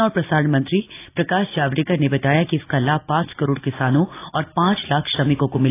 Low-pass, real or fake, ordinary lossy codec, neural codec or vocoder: 3.6 kHz; real; AAC, 24 kbps; none